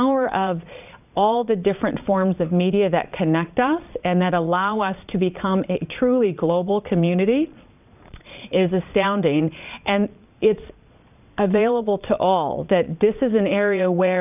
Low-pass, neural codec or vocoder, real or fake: 3.6 kHz; vocoder, 22.05 kHz, 80 mel bands, Vocos; fake